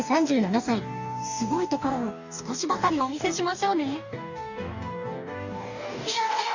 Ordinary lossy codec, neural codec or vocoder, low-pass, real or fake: none; codec, 44.1 kHz, 2.6 kbps, DAC; 7.2 kHz; fake